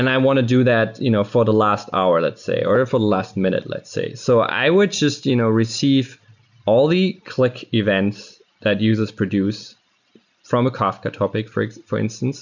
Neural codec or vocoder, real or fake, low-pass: none; real; 7.2 kHz